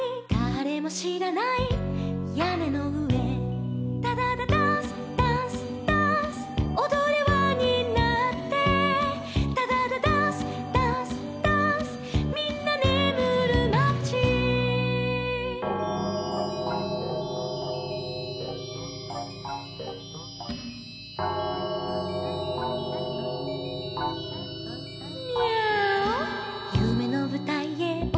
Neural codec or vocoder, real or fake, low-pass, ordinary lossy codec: none; real; none; none